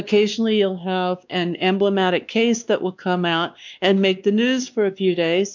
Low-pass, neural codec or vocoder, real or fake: 7.2 kHz; codec, 16 kHz, 2 kbps, X-Codec, WavLM features, trained on Multilingual LibriSpeech; fake